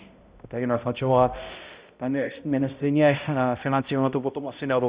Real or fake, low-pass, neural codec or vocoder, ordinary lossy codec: fake; 3.6 kHz; codec, 16 kHz, 0.5 kbps, X-Codec, HuBERT features, trained on balanced general audio; Opus, 64 kbps